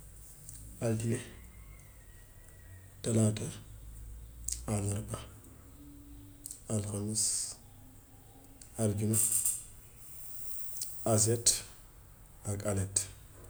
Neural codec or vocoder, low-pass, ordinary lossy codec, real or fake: none; none; none; real